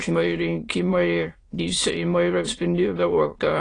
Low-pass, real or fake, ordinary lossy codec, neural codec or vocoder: 9.9 kHz; fake; AAC, 32 kbps; autoencoder, 22.05 kHz, a latent of 192 numbers a frame, VITS, trained on many speakers